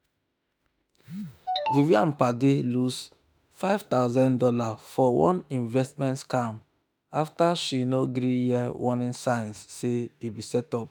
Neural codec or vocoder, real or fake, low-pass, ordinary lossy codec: autoencoder, 48 kHz, 32 numbers a frame, DAC-VAE, trained on Japanese speech; fake; none; none